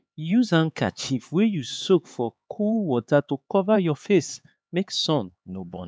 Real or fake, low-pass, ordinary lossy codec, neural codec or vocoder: fake; none; none; codec, 16 kHz, 4 kbps, X-Codec, HuBERT features, trained on LibriSpeech